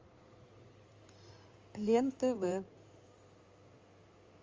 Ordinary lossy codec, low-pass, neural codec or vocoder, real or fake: Opus, 32 kbps; 7.2 kHz; codec, 16 kHz in and 24 kHz out, 2.2 kbps, FireRedTTS-2 codec; fake